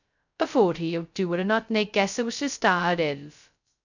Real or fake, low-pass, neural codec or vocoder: fake; 7.2 kHz; codec, 16 kHz, 0.2 kbps, FocalCodec